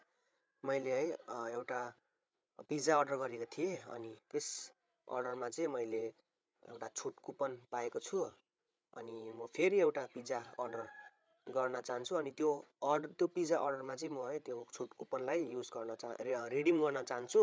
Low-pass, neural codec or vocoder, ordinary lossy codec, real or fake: none; codec, 16 kHz, 8 kbps, FreqCodec, larger model; none; fake